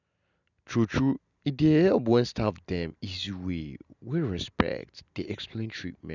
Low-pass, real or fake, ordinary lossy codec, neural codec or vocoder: 7.2 kHz; real; none; none